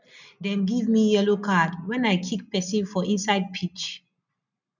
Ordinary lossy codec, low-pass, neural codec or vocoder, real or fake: none; 7.2 kHz; none; real